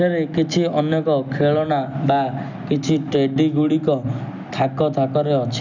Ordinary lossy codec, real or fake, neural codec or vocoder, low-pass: none; real; none; 7.2 kHz